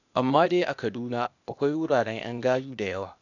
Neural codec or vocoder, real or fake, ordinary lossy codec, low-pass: codec, 16 kHz, 0.8 kbps, ZipCodec; fake; none; 7.2 kHz